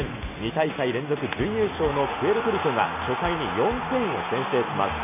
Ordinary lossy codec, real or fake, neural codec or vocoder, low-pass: none; real; none; 3.6 kHz